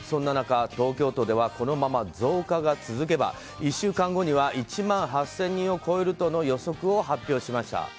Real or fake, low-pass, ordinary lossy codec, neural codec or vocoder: real; none; none; none